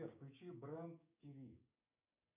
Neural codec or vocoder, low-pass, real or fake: codec, 16 kHz, 6 kbps, DAC; 3.6 kHz; fake